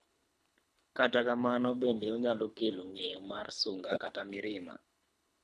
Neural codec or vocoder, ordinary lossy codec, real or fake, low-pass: codec, 24 kHz, 3 kbps, HILCodec; none; fake; none